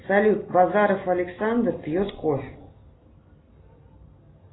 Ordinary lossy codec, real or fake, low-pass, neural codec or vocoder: AAC, 16 kbps; real; 7.2 kHz; none